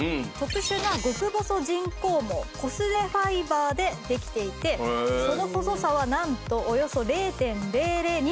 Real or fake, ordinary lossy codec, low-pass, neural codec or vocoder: real; none; none; none